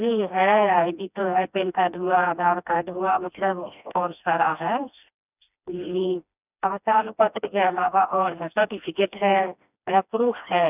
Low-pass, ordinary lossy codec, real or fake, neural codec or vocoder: 3.6 kHz; none; fake; codec, 16 kHz, 1 kbps, FreqCodec, smaller model